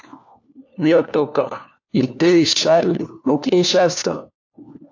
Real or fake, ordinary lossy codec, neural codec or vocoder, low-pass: fake; AAC, 48 kbps; codec, 16 kHz, 1 kbps, FunCodec, trained on LibriTTS, 50 frames a second; 7.2 kHz